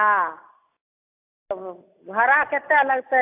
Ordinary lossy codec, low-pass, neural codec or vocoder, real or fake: AAC, 32 kbps; 3.6 kHz; none; real